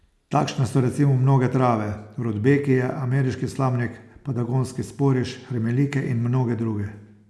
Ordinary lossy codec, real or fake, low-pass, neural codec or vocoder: none; real; none; none